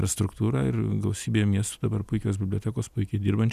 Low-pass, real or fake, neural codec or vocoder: 14.4 kHz; real; none